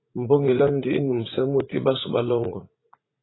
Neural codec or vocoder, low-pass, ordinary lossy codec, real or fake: vocoder, 44.1 kHz, 80 mel bands, Vocos; 7.2 kHz; AAC, 16 kbps; fake